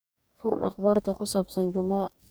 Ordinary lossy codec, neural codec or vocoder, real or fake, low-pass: none; codec, 44.1 kHz, 2.6 kbps, DAC; fake; none